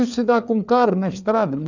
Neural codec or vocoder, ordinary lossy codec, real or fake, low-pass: codec, 16 kHz, 4 kbps, FreqCodec, larger model; none; fake; 7.2 kHz